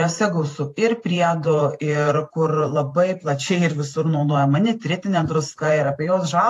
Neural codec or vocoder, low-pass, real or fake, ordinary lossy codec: vocoder, 44.1 kHz, 128 mel bands every 512 samples, BigVGAN v2; 14.4 kHz; fake; AAC, 48 kbps